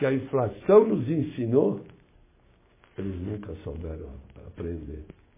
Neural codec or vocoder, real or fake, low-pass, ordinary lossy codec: codec, 16 kHz, 6 kbps, DAC; fake; 3.6 kHz; MP3, 16 kbps